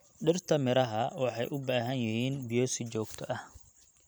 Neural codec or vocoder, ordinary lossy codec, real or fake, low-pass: vocoder, 44.1 kHz, 128 mel bands every 256 samples, BigVGAN v2; none; fake; none